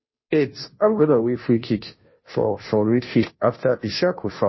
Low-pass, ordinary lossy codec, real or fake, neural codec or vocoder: 7.2 kHz; MP3, 24 kbps; fake; codec, 16 kHz, 0.5 kbps, FunCodec, trained on Chinese and English, 25 frames a second